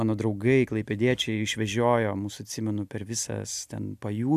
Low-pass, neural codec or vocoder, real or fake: 14.4 kHz; none; real